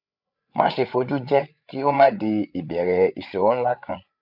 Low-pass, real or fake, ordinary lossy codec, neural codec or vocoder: 5.4 kHz; fake; none; codec, 16 kHz, 8 kbps, FreqCodec, larger model